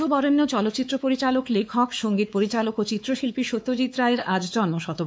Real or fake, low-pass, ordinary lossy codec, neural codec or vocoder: fake; none; none; codec, 16 kHz, 4 kbps, X-Codec, WavLM features, trained on Multilingual LibriSpeech